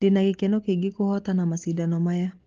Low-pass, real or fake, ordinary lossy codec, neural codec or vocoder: 7.2 kHz; real; Opus, 16 kbps; none